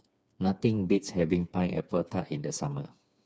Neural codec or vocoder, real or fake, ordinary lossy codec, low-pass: codec, 16 kHz, 4 kbps, FreqCodec, smaller model; fake; none; none